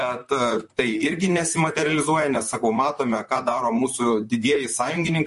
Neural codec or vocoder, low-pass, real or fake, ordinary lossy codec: vocoder, 44.1 kHz, 128 mel bands, Pupu-Vocoder; 14.4 kHz; fake; MP3, 48 kbps